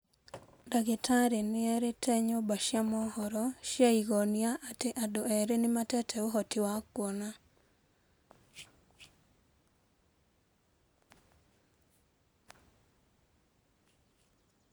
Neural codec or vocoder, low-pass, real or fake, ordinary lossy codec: none; none; real; none